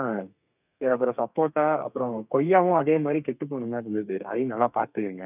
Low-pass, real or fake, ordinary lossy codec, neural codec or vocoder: 3.6 kHz; fake; none; codec, 32 kHz, 1.9 kbps, SNAC